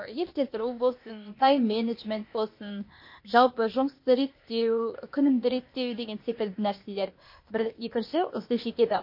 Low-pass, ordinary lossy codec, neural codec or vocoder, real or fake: 5.4 kHz; MP3, 32 kbps; codec, 16 kHz, 0.8 kbps, ZipCodec; fake